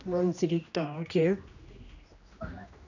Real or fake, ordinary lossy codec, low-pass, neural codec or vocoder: fake; none; 7.2 kHz; codec, 16 kHz, 1 kbps, X-Codec, HuBERT features, trained on general audio